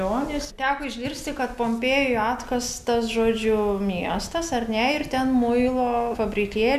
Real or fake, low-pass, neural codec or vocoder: real; 14.4 kHz; none